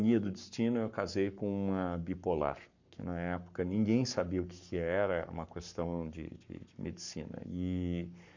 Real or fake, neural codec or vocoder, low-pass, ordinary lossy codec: fake; codec, 44.1 kHz, 7.8 kbps, Pupu-Codec; 7.2 kHz; none